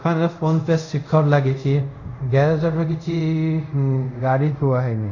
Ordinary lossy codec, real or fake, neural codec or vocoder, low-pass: none; fake; codec, 24 kHz, 0.5 kbps, DualCodec; 7.2 kHz